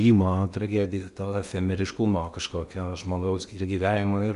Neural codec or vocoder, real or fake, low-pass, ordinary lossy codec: codec, 16 kHz in and 24 kHz out, 0.8 kbps, FocalCodec, streaming, 65536 codes; fake; 10.8 kHz; Opus, 64 kbps